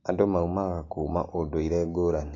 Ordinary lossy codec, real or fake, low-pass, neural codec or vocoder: AAC, 32 kbps; real; 7.2 kHz; none